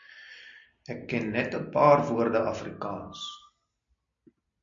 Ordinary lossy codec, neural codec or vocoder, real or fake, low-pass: MP3, 48 kbps; none; real; 7.2 kHz